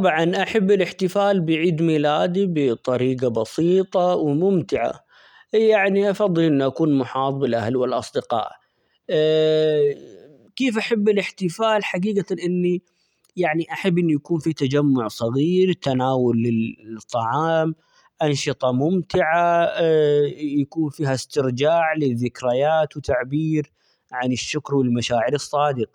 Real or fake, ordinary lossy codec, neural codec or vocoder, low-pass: real; none; none; 19.8 kHz